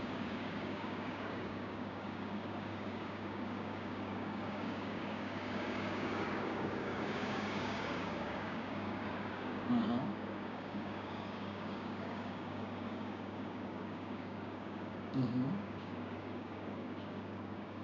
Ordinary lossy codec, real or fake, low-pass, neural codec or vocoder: none; fake; 7.2 kHz; codec, 16 kHz, 6 kbps, DAC